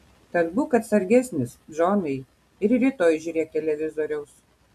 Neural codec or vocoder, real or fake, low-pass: none; real; 14.4 kHz